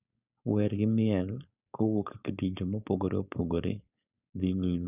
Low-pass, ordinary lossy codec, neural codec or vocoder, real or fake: 3.6 kHz; none; codec, 16 kHz, 4.8 kbps, FACodec; fake